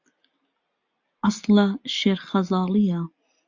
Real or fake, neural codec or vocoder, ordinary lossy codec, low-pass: real; none; AAC, 48 kbps; 7.2 kHz